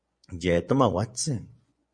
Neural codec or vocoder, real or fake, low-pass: vocoder, 24 kHz, 100 mel bands, Vocos; fake; 9.9 kHz